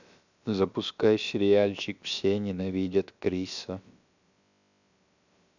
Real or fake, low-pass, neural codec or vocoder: fake; 7.2 kHz; codec, 16 kHz, about 1 kbps, DyCAST, with the encoder's durations